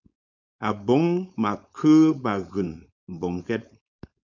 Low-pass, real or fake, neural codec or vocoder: 7.2 kHz; fake; codec, 16 kHz, 4.8 kbps, FACodec